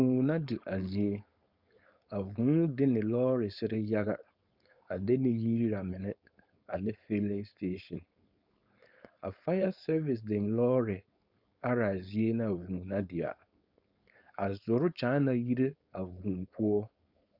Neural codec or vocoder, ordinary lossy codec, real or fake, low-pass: codec, 16 kHz, 4.8 kbps, FACodec; Opus, 64 kbps; fake; 5.4 kHz